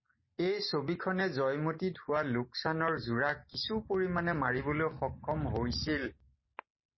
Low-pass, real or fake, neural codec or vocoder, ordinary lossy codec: 7.2 kHz; real; none; MP3, 24 kbps